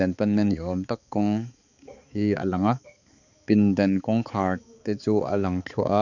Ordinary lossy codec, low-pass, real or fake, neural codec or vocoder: none; 7.2 kHz; fake; codec, 16 kHz, 4 kbps, X-Codec, HuBERT features, trained on balanced general audio